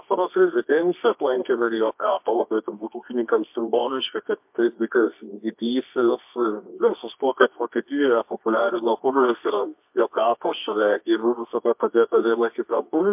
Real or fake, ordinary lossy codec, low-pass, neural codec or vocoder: fake; MP3, 32 kbps; 3.6 kHz; codec, 24 kHz, 0.9 kbps, WavTokenizer, medium music audio release